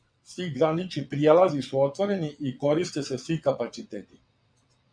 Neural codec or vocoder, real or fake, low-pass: vocoder, 22.05 kHz, 80 mel bands, WaveNeXt; fake; 9.9 kHz